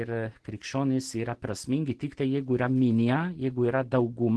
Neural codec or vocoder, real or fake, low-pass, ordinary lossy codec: none; real; 10.8 kHz; Opus, 16 kbps